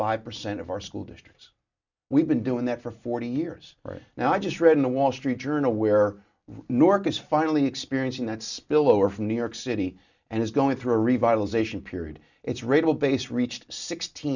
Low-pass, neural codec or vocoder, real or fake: 7.2 kHz; none; real